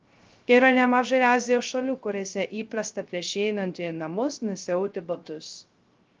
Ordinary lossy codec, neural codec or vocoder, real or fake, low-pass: Opus, 32 kbps; codec, 16 kHz, 0.3 kbps, FocalCodec; fake; 7.2 kHz